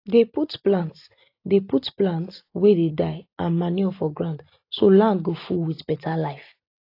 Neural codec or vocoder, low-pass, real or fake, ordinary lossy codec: none; 5.4 kHz; real; AAC, 32 kbps